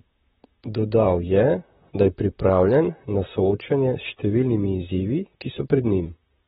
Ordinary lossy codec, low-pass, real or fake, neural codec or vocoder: AAC, 16 kbps; 19.8 kHz; fake; vocoder, 44.1 kHz, 128 mel bands, Pupu-Vocoder